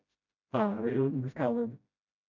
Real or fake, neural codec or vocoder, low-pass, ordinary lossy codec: fake; codec, 16 kHz, 0.5 kbps, FreqCodec, smaller model; 7.2 kHz; none